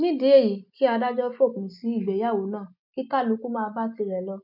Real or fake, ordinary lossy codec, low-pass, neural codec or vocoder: real; none; 5.4 kHz; none